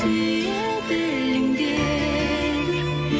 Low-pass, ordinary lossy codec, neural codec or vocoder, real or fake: none; none; none; real